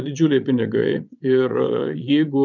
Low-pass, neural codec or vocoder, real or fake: 7.2 kHz; vocoder, 22.05 kHz, 80 mel bands, Vocos; fake